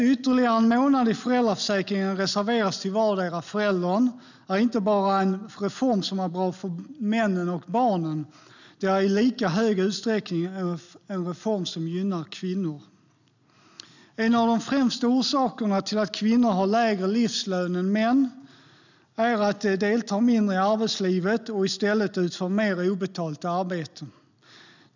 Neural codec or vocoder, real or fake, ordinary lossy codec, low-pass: none; real; none; 7.2 kHz